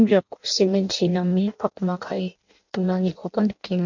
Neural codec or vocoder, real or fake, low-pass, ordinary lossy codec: codec, 16 kHz in and 24 kHz out, 0.6 kbps, FireRedTTS-2 codec; fake; 7.2 kHz; none